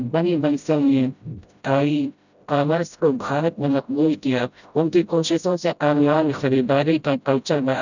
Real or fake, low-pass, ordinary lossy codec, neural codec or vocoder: fake; 7.2 kHz; none; codec, 16 kHz, 0.5 kbps, FreqCodec, smaller model